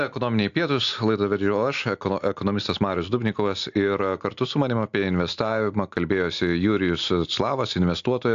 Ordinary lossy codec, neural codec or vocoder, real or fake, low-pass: AAC, 64 kbps; none; real; 7.2 kHz